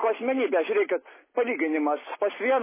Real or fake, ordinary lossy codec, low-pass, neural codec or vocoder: real; MP3, 16 kbps; 3.6 kHz; none